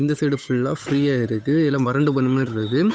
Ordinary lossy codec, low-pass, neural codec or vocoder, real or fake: none; none; codec, 16 kHz, 8 kbps, FunCodec, trained on Chinese and English, 25 frames a second; fake